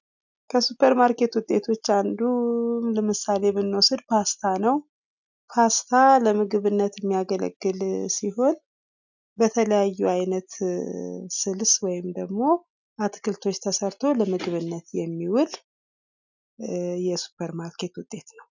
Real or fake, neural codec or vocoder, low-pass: real; none; 7.2 kHz